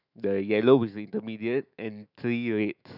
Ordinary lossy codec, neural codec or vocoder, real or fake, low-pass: none; autoencoder, 48 kHz, 128 numbers a frame, DAC-VAE, trained on Japanese speech; fake; 5.4 kHz